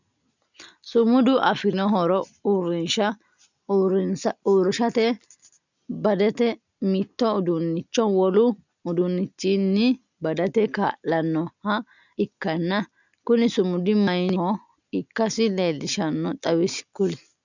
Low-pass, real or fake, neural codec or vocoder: 7.2 kHz; real; none